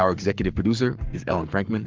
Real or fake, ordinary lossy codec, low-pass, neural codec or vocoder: fake; Opus, 24 kbps; 7.2 kHz; codec, 44.1 kHz, 7.8 kbps, Pupu-Codec